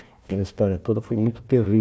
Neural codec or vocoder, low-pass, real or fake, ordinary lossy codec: codec, 16 kHz, 1 kbps, FunCodec, trained on Chinese and English, 50 frames a second; none; fake; none